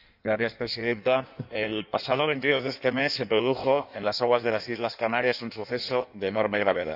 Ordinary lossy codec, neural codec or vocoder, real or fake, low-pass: none; codec, 16 kHz in and 24 kHz out, 1.1 kbps, FireRedTTS-2 codec; fake; 5.4 kHz